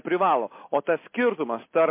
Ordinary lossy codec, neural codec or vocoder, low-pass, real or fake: MP3, 24 kbps; none; 3.6 kHz; real